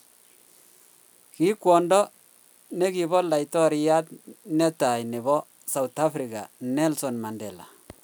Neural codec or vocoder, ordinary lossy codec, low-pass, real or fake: none; none; none; real